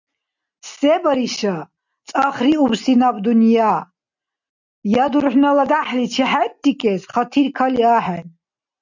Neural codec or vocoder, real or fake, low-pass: none; real; 7.2 kHz